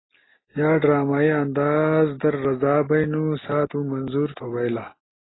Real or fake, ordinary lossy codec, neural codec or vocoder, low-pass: real; AAC, 16 kbps; none; 7.2 kHz